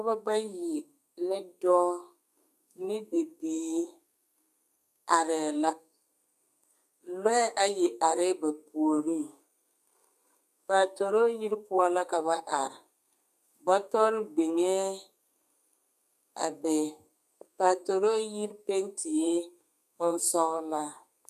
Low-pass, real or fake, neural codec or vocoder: 14.4 kHz; fake; codec, 32 kHz, 1.9 kbps, SNAC